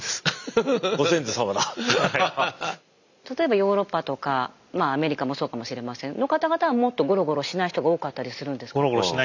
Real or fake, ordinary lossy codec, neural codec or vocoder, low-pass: real; none; none; 7.2 kHz